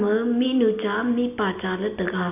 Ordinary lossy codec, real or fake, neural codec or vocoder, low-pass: none; real; none; 3.6 kHz